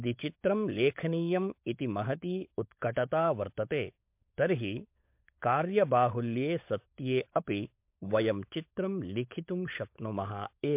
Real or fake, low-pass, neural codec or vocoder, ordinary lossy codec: fake; 3.6 kHz; codec, 16 kHz, 16 kbps, FunCodec, trained on LibriTTS, 50 frames a second; MP3, 32 kbps